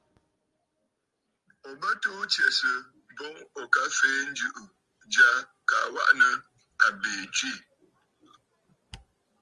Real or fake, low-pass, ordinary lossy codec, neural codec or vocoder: real; 10.8 kHz; Opus, 32 kbps; none